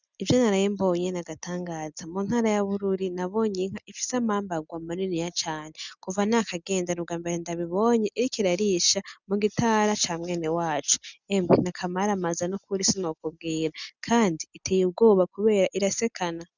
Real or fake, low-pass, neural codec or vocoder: real; 7.2 kHz; none